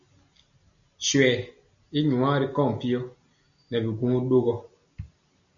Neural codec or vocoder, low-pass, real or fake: none; 7.2 kHz; real